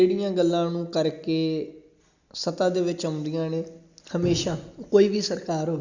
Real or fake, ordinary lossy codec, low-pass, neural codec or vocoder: real; Opus, 64 kbps; 7.2 kHz; none